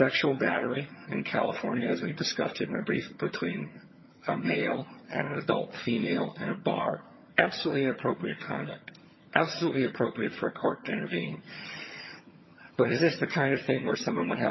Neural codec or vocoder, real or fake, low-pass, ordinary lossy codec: vocoder, 22.05 kHz, 80 mel bands, HiFi-GAN; fake; 7.2 kHz; MP3, 24 kbps